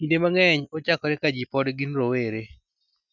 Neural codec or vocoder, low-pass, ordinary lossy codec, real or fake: none; 7.2 kHz; none; real